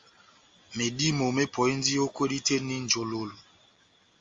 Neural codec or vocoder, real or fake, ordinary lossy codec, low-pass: none; real; Opus, 32 kbps; 7.2 kHz